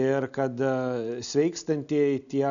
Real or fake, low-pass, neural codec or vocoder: real; 7.2 kHz; none